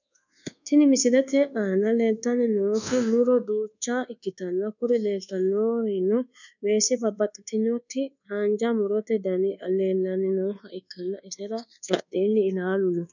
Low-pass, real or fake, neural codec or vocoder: 7.2 kHz; fake; codec, 24 kHz, 1.2 kbps, DualCodec